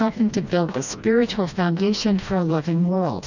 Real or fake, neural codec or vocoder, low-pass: fake; codec, 16 kHz, 1 kbps, FreqCodec, smaller model; 7.2 kHz